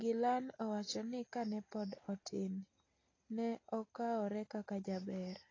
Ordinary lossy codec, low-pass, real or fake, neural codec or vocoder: AAC, 32 kbps; 7.2 kHz; real; none